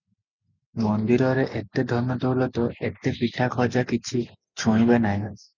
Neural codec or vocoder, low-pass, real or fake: none; 7.2 kHz; real